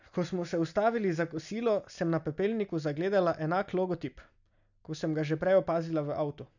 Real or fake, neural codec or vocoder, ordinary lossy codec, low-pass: real; none; none; 7.2 kHz